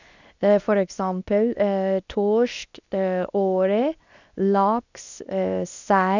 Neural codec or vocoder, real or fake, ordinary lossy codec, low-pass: codec, 24 kHz, 0.9 kbps, WavTokenizer, medium speech release version 2; fake; none; 7.2 kHz